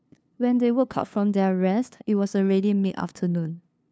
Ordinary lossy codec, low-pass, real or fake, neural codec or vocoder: none; none; fake; codec, 16 kHz, 2 kbps, FunCodec, trained on LibriTTS, 25 frames a second